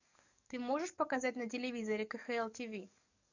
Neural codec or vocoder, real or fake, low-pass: codec, 44.1 kHz, 7.8 kbps, DAC; fake; 7.2 kHz